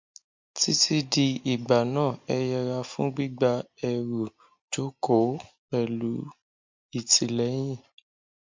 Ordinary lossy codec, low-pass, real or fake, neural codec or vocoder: MP3, 48 kbps; 7.2 kHz; real; none